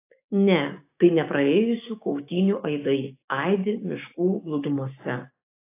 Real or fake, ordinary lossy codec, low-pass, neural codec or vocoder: fake; AAC, 24 kbps; 3.6 kHz; codec, 16 kHz, 6 kbps, DAC